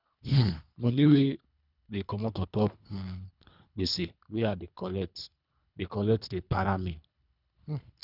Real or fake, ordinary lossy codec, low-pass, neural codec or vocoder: fake; none; 5.4 kHz; codec, 24 kHz, 3 kbps, HILCodec